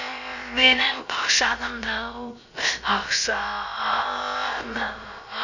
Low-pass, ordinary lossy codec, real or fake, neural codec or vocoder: 7.2 kHz; none; fake; codec, 16 kHz, about 1 kbps, DyCAST, with the encoder's durations